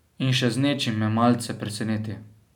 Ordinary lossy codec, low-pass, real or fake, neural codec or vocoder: none; 19.8 kHz; real; none